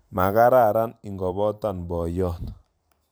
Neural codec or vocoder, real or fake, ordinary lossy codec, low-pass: vocoder, 44.1 kHz, 128 mel bands every 512 samples, BigVGAN v2; fake; none; none